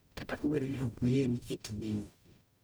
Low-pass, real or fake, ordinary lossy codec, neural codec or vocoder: none; fake; none; codec, 44.1 kHz, 0.9 kbps, DAC